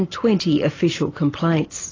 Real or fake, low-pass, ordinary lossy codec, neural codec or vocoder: real; 7.2 kHz; AAC, 32 kbps; none